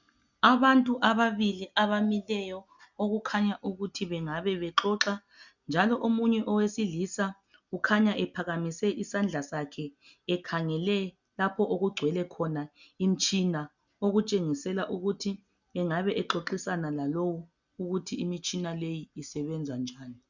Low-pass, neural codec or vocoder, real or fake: 7.2 kHz; none; real